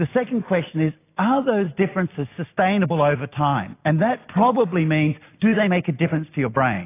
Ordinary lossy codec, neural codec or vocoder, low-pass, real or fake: AAC, 24 kbps; none; 3.6 kHz; real